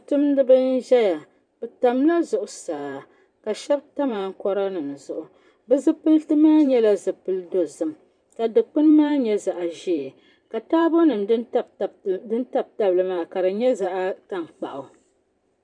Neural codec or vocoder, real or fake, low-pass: vocoder, 44.1 kHz, 128 mel bands every 512 samples, BigVGAN v2; fake; 9.9 kHz